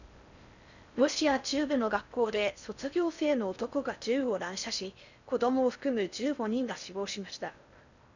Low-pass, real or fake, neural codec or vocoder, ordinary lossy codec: 7.2 kHz; fake; codec, 16 kHz in and 24 kHz out, 0.6 kbps, FocalCodec, streaming, 4096 codes; none